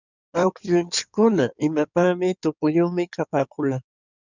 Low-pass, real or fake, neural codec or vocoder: 7.2 kHz; fake; codec, 16 kHz in and 24 kHz out, 2.2 kbps, FireRedTTS-2 codec